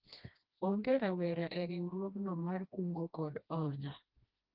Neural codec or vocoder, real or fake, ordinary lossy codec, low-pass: codec, 16 kHz, 1 kbps, FreqCodec, smaller model; fake; Opus, 32 kbps; 5.4 kHz